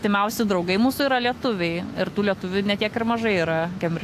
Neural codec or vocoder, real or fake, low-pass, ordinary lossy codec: autoencoder, 48 kHz, 128 numbers a frame, DAC-VAE, trained on Japanese speech; fake; 14.4 kHz; AAC, 64 kbps